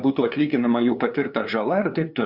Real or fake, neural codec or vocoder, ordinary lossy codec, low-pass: fake; codec, 16 kHz, 2 kbps, FunCodec, trained on LibriTTS, 25 frames a second; Opus, 64 kbps; 5.4 kHz